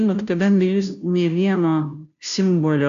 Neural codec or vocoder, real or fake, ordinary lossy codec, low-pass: codec, 16 kHz, 0.5 kbps, FunCodec, trained on Chinese and English, 25 frames a second; fake; AAC, 96 kbps; 7.2 kHz